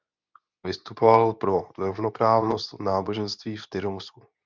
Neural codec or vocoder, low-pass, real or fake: codec, 24 kHz, 0.9 kbps, WavTokenizer, medium speech release version 2; 7.2 kHz; fake